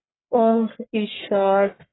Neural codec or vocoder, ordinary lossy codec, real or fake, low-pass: codec, 44.1 kHz, 1.7 kbps, Pupu-Codec; AAC, 16 kbps; fake; 7.2 kHz